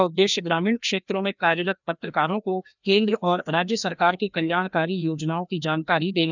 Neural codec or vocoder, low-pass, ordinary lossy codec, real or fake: codec, 16 kHz, 1 kbps, FreqCodec, larger model; 7.2 kHz; none; fake